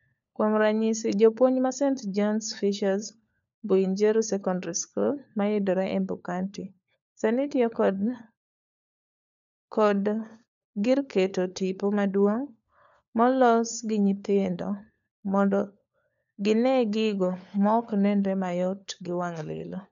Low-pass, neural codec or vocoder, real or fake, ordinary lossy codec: 7.2 kHz; codec, 16 kHz, 4 kbps, FunCodec, trained on LibriTTS, 50 frames a second; fake; none